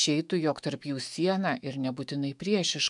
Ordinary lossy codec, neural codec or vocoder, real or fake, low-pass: MP3, 96 kbps; autoencoder, 48 kHz, 128 numbers a frame, DAC-VAE, trained on Japanese speech; fake; 10.8 kHz